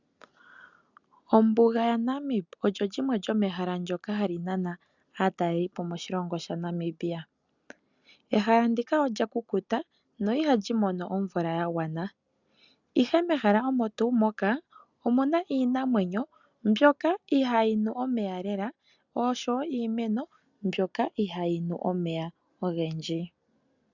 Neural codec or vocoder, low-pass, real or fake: none; 7.2 kHz; real